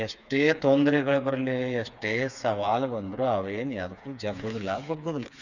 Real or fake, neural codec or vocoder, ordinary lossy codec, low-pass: fake; codec, 16 kHz, 4 kbps, FreqCodec, smaller model; none; 7.2 kHz